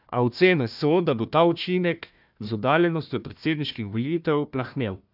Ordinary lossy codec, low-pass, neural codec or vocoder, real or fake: none; 5.4 kHz; codec, 16 kHz, 1 kbps, FunCodec, trained on Chinese and English, 50 frames a second; fake